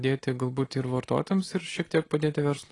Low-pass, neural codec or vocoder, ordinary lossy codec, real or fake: 10.8 kHz; none; AAC, 32 kbps; real